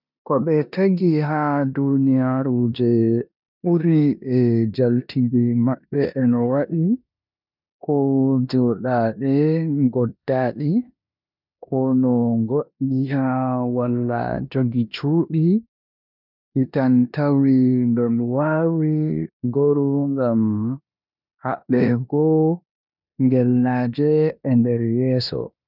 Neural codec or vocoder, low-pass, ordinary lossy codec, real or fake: codec, 16 kHz in and 24 kHz out, 0.9 kbps, LongCat-Audio-Codec, four codebook decoder; 5.4 kHz; none; fake